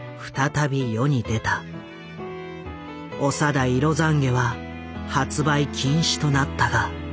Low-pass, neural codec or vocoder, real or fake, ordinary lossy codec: none; none; real; none